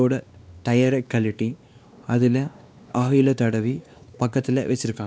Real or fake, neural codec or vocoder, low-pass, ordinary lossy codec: fake; codec, 16 kHz, 2 kbps, X-Codec, WavLM features, trained on Multilingual LibriSpeech; none; none